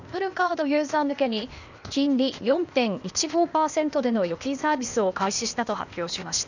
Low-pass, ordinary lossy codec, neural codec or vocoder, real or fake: 7.2 kHz; none; codec, 16 kHz, 0.8 kbps, ZipCodec; fake